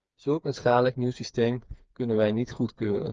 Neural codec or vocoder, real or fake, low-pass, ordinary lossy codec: codec, 16 kHz, 4 kbps, FreqCodec, smaller model; fake; 7.2 kHz; Opus, 24 kbps